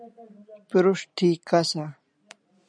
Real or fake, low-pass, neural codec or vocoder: real; 9.9 kHz; none